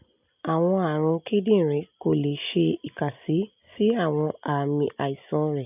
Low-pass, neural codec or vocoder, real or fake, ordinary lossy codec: 3.6 kHz; none; real; none